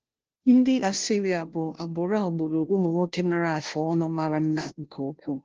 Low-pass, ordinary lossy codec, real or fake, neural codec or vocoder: 7.2 kHz; Opus, 16 kbps; fake; codec, 16 kHz, 0.5 kbps, FunCodec, trained on Chinese and English, 25 frames a second